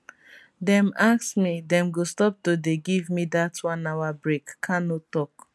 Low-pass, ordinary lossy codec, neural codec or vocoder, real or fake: none; none; none; real